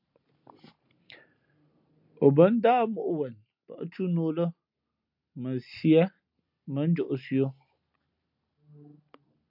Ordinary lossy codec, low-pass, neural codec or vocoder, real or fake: MP3, 48 kbps; 5.4 kHz; none; real